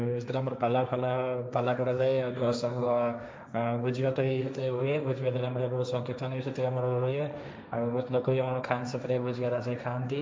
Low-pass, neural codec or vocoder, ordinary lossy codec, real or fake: none; codec, 16 kHz, 1.1 kbps, Voila-Tokenizer; none; fake